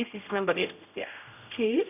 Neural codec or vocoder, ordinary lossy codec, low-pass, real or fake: codec, 16 kHz, 0.5 kbps, X-Codec, HuBERT features, trained on general audio; none; 3.6 kHz; fake